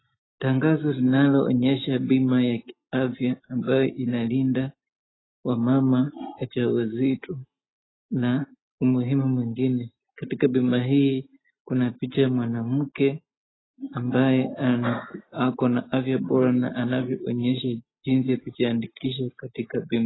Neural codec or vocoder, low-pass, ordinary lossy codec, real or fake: none; 7.2 kHz; AAC, 16 kbps; real